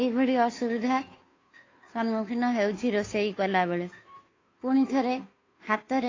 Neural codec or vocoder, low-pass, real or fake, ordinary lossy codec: codec, 16 kHz, 2 kbps, FunCodec, trained on Chinese and English, 25 frames a second; 7.2 kHz; fake; AAC, 32 kbps